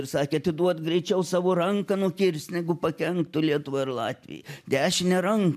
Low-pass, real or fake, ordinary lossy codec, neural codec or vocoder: 14.4 kHz; real; MP3, 96 kbps; none